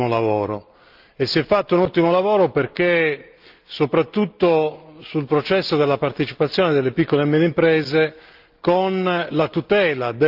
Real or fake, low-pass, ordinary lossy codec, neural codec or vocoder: real; 5.4 kHz; Opus, 32 kbps; none